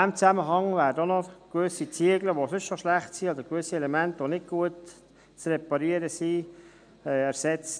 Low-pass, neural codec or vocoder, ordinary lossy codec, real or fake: 9.9 kHz; none; none; real